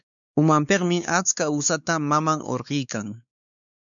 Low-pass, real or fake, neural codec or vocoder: 7.2 kHz; fake; codec, 16 kHz, 4 kbps, X-Codec, WavLM features, trained on Multilingual LibriSpeech